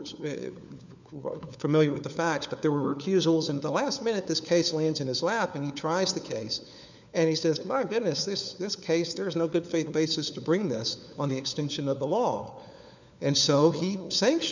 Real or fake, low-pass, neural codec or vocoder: fake; 7.2 kHz; codec, 16 kHz, 4 kbps, FunCodec, trained on LibriTTS, 50 frames a second